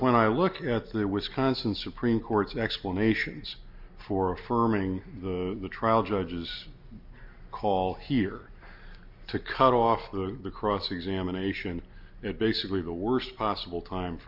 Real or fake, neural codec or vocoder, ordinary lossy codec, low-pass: real; none; MP3, 48 kbps; 5.4 kHz